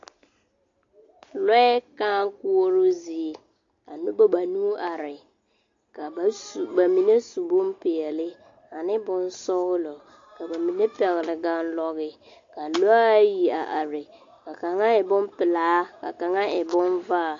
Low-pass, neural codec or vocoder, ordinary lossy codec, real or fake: 7.2 kHz; none; AAC, 48 kbps; real